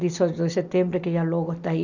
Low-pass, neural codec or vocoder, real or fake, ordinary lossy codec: 7.2 kHz; none; real; none